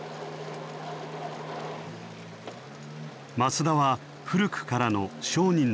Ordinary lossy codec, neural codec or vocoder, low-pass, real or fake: none; none; none; real